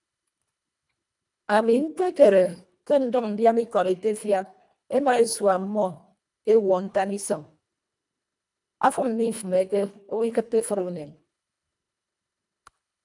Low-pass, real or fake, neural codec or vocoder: 10.8 kHz; fake; codec, 24 kHz, 1.5 kbps, HILCodec